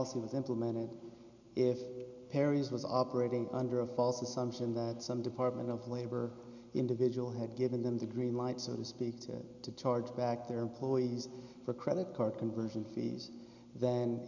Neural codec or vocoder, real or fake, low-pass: none; real; 7.2 kHz